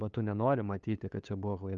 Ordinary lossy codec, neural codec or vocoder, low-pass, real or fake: Opus, 32 kbps; codec, 16 kHz, 8 kbps, FunCodec, trained on LibriTTS, 25 frames a second; 7.2 kHz; fake